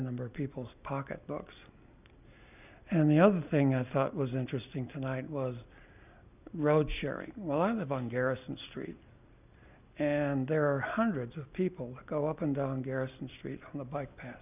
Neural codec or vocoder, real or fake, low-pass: none; real; 3.6 kHz